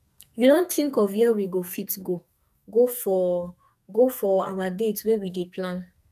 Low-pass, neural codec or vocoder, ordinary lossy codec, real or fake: 14.4 kHz; codec, 44.1 kHz, 2.6 kbps, SNAC; none; fake